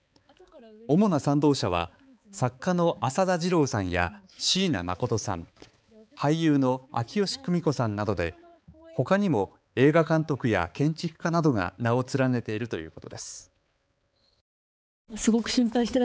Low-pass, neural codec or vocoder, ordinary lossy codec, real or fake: none; codec, 16 kHz, 4 kbps, X-Codec, HuBERT features, trained on balanced general audio; none; fake